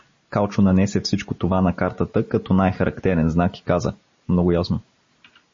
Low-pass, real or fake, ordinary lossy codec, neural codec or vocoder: 7.2 kHz; real; MP3, 32 kbps; none